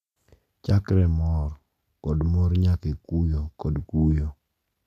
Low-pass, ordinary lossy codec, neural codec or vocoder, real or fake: 14.4 kHz; none; none; real